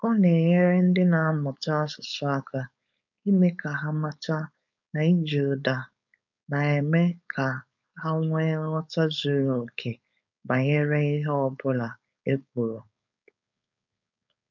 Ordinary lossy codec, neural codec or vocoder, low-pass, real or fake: none; codec, 16 kHz, 4.8 kbps, FACodec; 7.2 kHz; fake